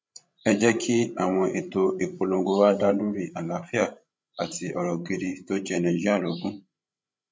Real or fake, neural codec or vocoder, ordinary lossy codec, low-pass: fake; codec, 16 kHz, 16 kbps, FreqCodec, larger model; none; none